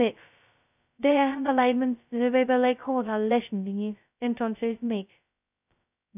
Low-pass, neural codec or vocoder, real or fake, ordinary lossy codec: 3.6 kHz; codec, 16 kHz, 0.2 kbps, FocalCodec; fake; none